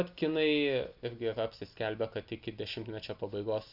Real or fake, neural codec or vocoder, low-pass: real; none; 5.4 kHz